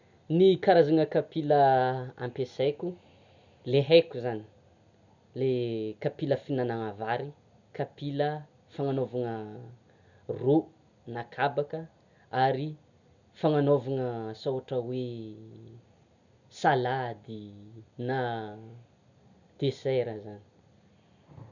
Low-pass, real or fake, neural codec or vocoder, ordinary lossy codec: 7.2 kHz; real; none; none